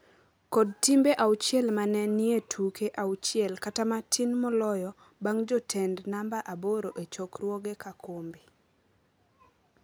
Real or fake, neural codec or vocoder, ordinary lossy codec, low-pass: real; none; none; none